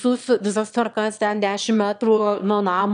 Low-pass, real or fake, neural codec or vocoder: 9.9 kHz; fake; autoencoder, 22.05 kHz, a latent of 192 numbers a frame, VITS, trained on one speaker